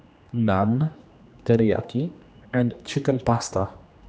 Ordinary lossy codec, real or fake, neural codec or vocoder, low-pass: none; fake; codec, 16 kHz, 2 kbps, X-Codec, HuBERT features, trained on general audio; none